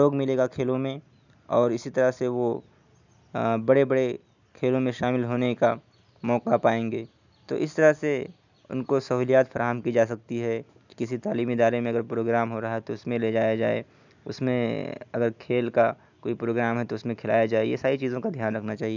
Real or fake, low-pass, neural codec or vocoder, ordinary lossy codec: real; 7.2 kHz; none; none